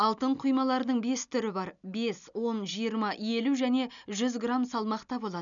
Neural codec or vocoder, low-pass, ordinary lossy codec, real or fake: none; 7.2 kHz; none; real